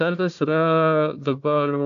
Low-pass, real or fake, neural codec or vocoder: 7.2 kHz; fake; codec, 16 kHz, 1 kbps, FunCodec, trained on Chinese and English, 50 frames a second